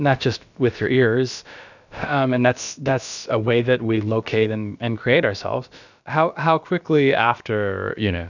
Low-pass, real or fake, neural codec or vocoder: 7.2 kHz; fake; codec, 16 kHz, about 1 kbps, DyCAST, with the encoder's durations